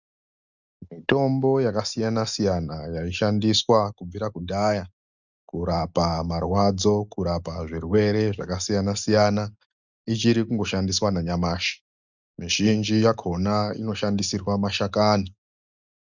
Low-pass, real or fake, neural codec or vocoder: 7.2 kHz; real; none